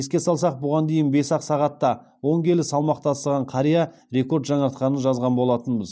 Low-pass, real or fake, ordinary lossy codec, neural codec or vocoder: none; real; none; none